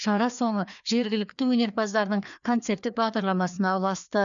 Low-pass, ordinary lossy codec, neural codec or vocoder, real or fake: 7.2 kHz; none; codec, 16 kHz, 2 kbps, FreqCodec, larger model; fake